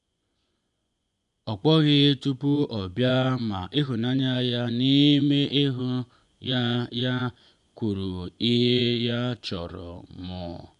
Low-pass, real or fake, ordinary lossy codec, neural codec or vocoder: 10.8 kHz; fake; none; vocoder, 24 kHz, 100 mel bands, Vocos